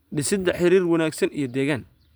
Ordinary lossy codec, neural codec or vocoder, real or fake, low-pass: none; vocoder, 44.1 kHz, 128 mel bands every 512 samples, BigVGAN v2; fake; none